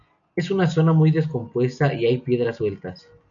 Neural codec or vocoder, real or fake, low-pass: none; real; 7.2 kHz